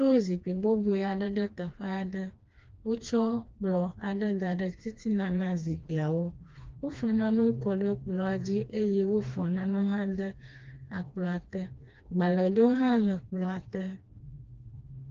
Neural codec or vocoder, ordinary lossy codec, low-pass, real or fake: codec, 16 kHz, 2 kbps, FreqCodec, smaller model; Opus, 24 kbps; 7.2 kHz; fake